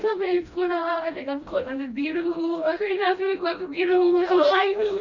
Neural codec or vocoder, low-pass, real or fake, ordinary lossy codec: codec, 16 kHz, 1 kbps, FreqCodec, smaller model; 7.2 kHz; fake; none